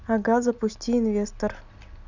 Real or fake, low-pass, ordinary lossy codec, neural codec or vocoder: real; 7.2 kHz; none; none